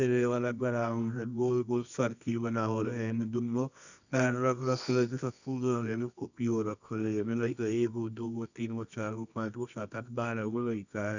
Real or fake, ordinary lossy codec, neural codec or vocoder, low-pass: fake; none; codec, 24 kHz, 0.9 kbps, WavTokenizer, medium music audio release; 7.2 kHz